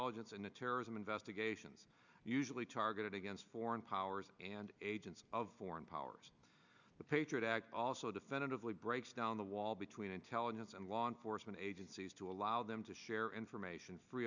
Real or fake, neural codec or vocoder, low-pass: real; none; 7.2 kHz